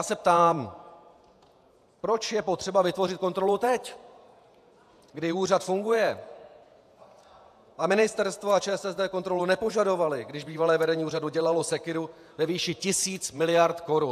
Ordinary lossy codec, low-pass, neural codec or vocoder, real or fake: AAC, 96 kbps; 14.4 kHz; vocoder, 48 kHz, 128 mel bands, Vocos; fake